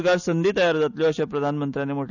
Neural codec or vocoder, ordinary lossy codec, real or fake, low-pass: none; none; real; 7.2 kHz